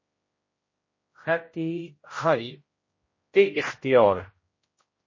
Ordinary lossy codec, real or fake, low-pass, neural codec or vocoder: MP3, 32 kbps; fake; 7.2 kHz; codec, 16 kHz, 0.5 kbps, X-Codec, HuBERT features, trained on general audio